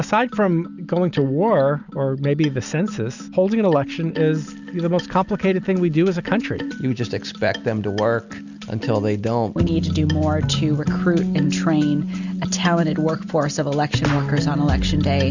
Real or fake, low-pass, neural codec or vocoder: real; 7.2 kHz; none